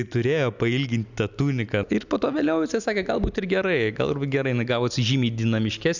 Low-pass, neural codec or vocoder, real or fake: 7.2 kHz; none; real